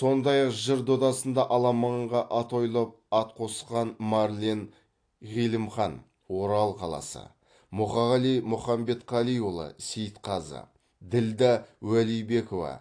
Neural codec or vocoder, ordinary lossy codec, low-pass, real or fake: none; AAC, 48 kbps; 9.9 kHz; real